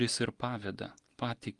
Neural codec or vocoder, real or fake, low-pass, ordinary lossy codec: none; real; 10.8 kHz; Opus, 24 kbps